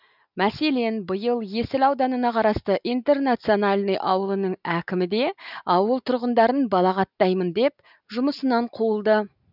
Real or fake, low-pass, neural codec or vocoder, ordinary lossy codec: real; 5.4 kHz; none; none